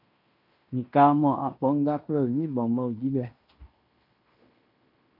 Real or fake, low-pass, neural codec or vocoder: fake; 5.4 kHz; codec, 16 kHz in and 24 kHz out, 0.9 kbps, LongCat-Audio-Codec, fine tuned four codebook decoder